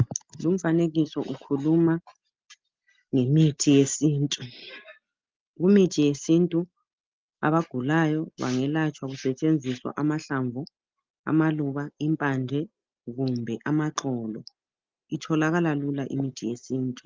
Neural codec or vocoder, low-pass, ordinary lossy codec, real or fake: none; 7.2 kHz; Opus, 24 kbps; real